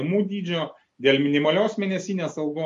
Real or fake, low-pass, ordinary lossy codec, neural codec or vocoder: real; 14.4 kHz; MP3, 48 kbps; none